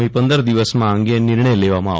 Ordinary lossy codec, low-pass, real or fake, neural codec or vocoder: none; none; real; none